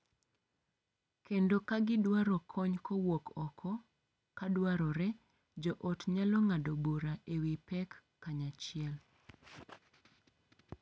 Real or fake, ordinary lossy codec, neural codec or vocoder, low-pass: real; none; none; none